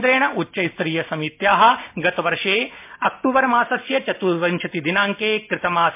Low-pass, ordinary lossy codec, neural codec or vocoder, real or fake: 3.6 kHz; MP3, 32 kbps; none; real